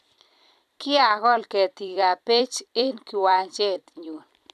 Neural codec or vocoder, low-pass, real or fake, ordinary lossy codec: vocoder, 44.1 kHz, 128 mel bands every 256 samples, BigVGAN v2; 14.4 kHz; fake; none